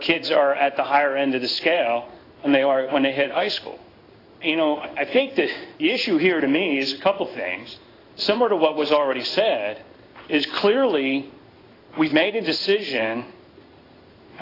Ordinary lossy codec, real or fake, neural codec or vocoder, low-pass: AAC, 24 kbps; fake; codec, 16 kHz in and 24 kHz out, 1 kbps, XY-Tokenizer; 5.4 kHz